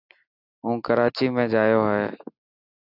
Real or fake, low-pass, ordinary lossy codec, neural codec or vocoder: real; 5.4 kHz; AAC, 48 kbps; none